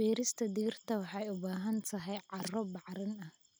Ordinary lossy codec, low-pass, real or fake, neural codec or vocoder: none; none; real; none